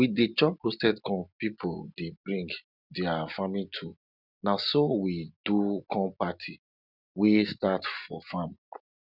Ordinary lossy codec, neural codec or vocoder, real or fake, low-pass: none; none; real; 5.4 kHz